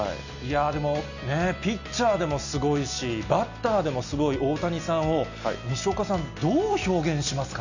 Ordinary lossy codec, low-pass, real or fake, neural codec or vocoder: none; 7.2 kHz; real; none